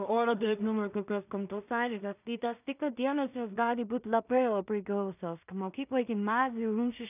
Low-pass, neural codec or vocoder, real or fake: 3.6 kHz; codec, 16 kHz in and 24 kHz out, 0.4 kbps, LongCat-Audio-Codec, two codebook decoder; fake